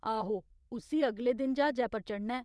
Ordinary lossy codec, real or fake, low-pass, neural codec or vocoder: none; fake; none; vocoder, 22.05 kHz, 80 mel bands, WaveNeXt